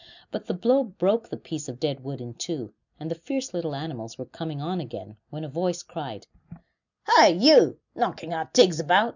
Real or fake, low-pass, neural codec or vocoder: real; 7.2 kHz; none